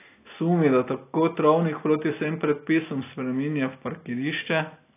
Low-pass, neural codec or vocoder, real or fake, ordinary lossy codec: 3.6 kHz; none; real; AAC, 24 kbps